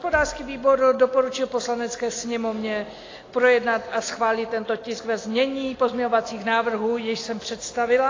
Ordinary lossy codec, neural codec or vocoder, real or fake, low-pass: AAC, 32 kbps; none; real; 7.2 kHz